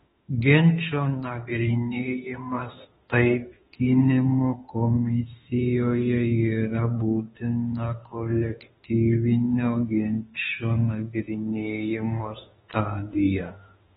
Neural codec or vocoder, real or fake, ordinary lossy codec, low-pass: autoencoder, 48 kHz, 32 numbers a frame, DAC-VAE, trained on Japanese speech; fake; AAC, 16 kbps; 19.8 kHz